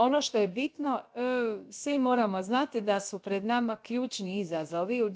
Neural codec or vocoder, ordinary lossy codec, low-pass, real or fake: codec, 16 kHz, about 1 kbps, DyCAST, with the encoder's durations; none; none; fake